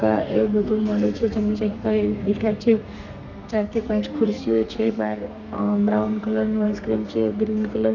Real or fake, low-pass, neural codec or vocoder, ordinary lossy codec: fake; 7.2 kHz; codec, 32 kHz, 1.9 kbps, SNAC; none